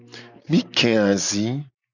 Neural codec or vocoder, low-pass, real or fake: none; 7.2 kHz; real